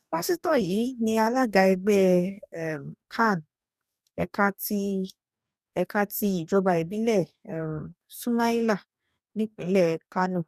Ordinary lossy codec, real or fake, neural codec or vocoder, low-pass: none; fake; codec, 44.1 kHz, 2.6 kbps, DAC; 14.4 kHz